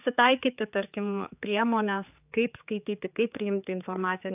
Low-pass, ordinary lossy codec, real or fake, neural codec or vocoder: 3.6 kHz; AAC, 32 kbps; fake; codec, 16 kHz, 4 kbps, X-Codec, HuBERT features, trained on general audio